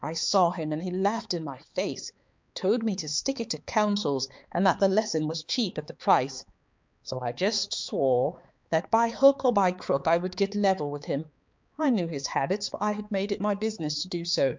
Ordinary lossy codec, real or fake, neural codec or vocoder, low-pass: MP3, 64 kbps; fake; codec, 16 kHz, 4 kbps, X-Codec, HuBERT features, trained on balanced general audio; 7.2 kHz